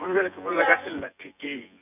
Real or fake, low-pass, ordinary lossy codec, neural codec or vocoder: fake; 3.6 kHz; none; vocoder, 24 kHz, 100 mel bands, Vocos